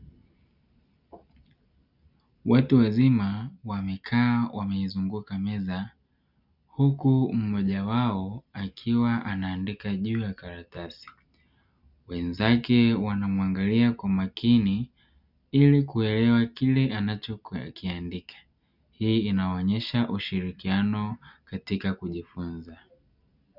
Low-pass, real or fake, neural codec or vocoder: 5.4 kHz; real; none